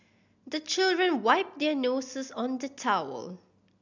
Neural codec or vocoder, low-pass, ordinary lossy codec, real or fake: none; 7.2 kHz; none; real